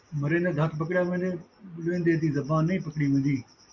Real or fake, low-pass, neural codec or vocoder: real; 7.2 kHz; none